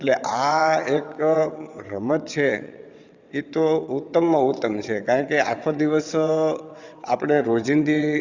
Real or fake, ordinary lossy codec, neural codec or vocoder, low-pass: fake; Opus, 64 kbps; vocoder, 22.05 kHz, 80 mel bands, WaveNeXt; 7.2 kHz